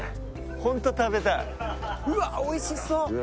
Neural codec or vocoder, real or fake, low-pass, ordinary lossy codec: none; real; none; none